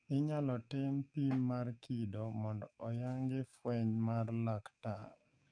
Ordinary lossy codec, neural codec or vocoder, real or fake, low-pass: none; codec, 44.1 kHz, 7.8 kbps, DAC; fake; 9.9 kHz